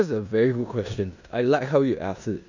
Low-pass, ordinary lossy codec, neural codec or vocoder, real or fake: 7.2 kHz; none; codec, 16 kHz in and 24 kHz out, 0.9 kbps, LongCat-Audio-Codec, four codebook decoder; fake